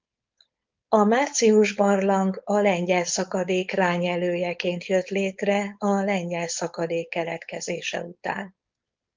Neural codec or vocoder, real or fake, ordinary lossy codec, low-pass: codec, 16 kHz, 4.8 kbps, FACodec; fake; Opus, 32 kbps; 7.2 kHz